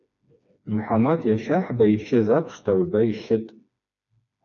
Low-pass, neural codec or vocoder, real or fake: 7.2 kHz; codec, 16 kHz, 2 kbps, FreqCodec, smaller model; fake